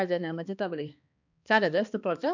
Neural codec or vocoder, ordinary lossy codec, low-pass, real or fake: codec, 16 kHz, 2 kbps, X-Codec, HuBERT features, trained on balanced general audio; none; 7.2 kHz; fake